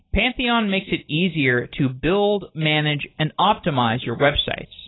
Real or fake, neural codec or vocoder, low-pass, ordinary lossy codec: real; none; 7.2 kHz; AAC, 16 kbps